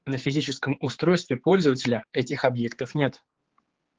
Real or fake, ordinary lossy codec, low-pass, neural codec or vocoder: fake; Opus, 16 kbps; 7.2 kHz; codec, 16 kHz, 4 kbps, X-Codec, HuBERT features, trained on general audio